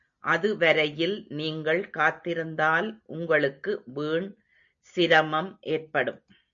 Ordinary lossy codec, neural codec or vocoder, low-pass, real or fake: MP3, 64 kbps; none; 7.2 kHz; real